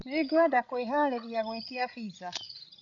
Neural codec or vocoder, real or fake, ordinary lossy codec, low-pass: codec, 16 kHz, 16 kbps, FreqCodec, larger model; fake; none; 7.2 kHz